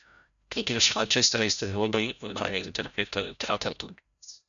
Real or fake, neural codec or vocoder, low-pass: fake; codec, 16 kHz, 0.5 kbps, FreqCodec, larger model; 7.2 kHz